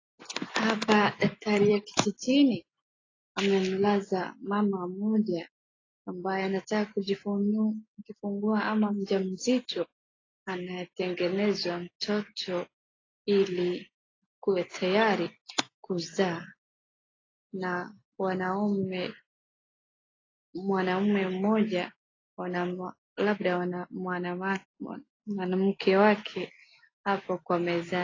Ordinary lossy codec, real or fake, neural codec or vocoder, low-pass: AAC, 32 kbps; real; none; 7.2 kHz